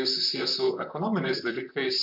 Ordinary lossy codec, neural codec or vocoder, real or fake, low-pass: MP3, 48 kbps; vocoder, 44.1 kHz, 128 mel bands, Pupu-Vocoder; fake; 5.4 kHz